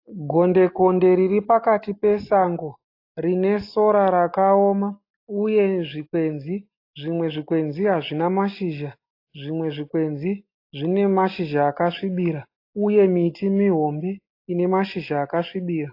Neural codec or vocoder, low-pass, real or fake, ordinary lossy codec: none; 5.4 kHz; real; AAC, 32 kbps